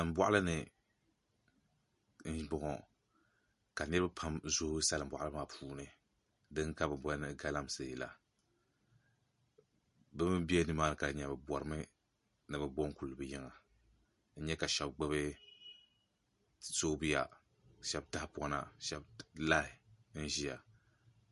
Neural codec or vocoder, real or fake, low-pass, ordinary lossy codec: none; real; 14.4 kHz; MP3, 48 kbps